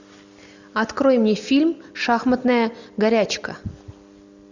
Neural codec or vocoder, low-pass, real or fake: none; 7.2 kHz; real